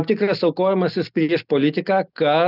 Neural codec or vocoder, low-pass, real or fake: none; 5.4 kHz; real